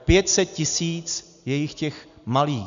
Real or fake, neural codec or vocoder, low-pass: real; none; 7.2 kHz